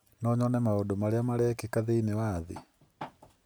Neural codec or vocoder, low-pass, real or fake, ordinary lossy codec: none; none; real; none